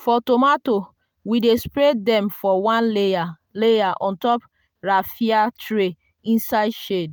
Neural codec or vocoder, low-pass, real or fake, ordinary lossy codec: none; none; real; none